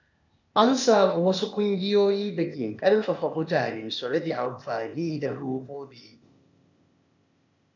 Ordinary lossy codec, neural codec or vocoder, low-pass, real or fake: none; codec, 16 kHz, 0.8 kbps, ZipCodec; 7.2 kHz; fake